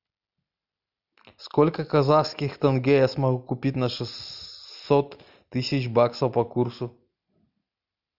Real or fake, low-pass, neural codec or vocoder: real; 5.4 kHz; none